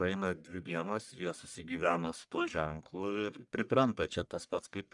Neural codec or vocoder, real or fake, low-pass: codec, 44.1 kHz, 1.7 kbps, Pupu-Codec; fake; 10.8 kHz